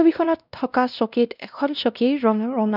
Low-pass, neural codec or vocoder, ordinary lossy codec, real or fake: 5.4 kHz; codec, 16 kHz, 1 kbps, X-Codec, WavLM features, trained on Multilingual LibriSpeech; none; fake